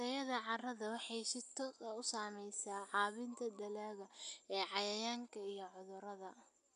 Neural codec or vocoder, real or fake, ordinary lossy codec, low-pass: none; real; none; 10.8 kHz